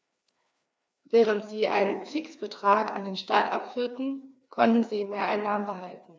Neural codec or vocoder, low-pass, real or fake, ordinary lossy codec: codec, 16 kHz, 2 kbps, FreqCodec, larger model; none; fake; none